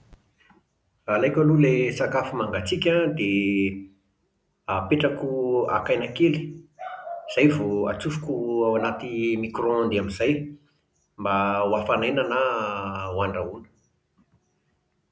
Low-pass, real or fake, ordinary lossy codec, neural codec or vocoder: none; real; none; none